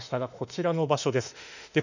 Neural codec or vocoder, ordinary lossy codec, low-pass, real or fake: autoencoder, 48 kHz, 32 numbers a frame, DAC-VAE, trained on Japanese speech; none; 7.2 kHz; fake